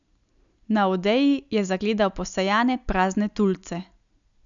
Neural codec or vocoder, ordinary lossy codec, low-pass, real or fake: none; none; 7.2 kHz; real